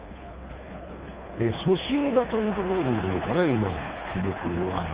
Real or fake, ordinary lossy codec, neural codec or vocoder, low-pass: fake; Opus, 32 kbps; codec, 24 kHz, 3 kbps, HILCodec; 3.6 kHz